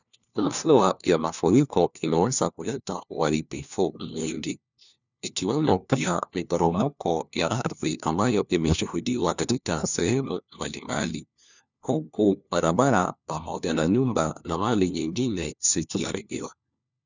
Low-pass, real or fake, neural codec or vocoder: 7.2 kHz; fake; codec, 16 kHz, 1 kbps, FunCodec, trained on LibriTTS, 50 frames a second